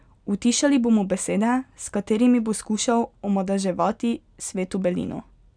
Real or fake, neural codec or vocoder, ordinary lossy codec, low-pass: real; none; none; 9.9 kHz